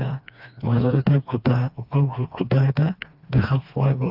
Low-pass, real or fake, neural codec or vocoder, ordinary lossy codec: 5.4 kHz; fake; codec, 16 kHz, 2 kbps, FreqCodec, smaller model; AAC, 48 kbps